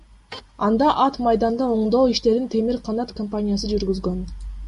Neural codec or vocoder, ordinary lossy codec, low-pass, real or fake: none; MP3, 48 kbps; 14.4 kHz; real